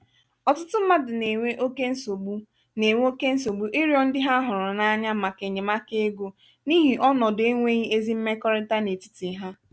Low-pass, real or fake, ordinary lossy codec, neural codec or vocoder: none; real; none; none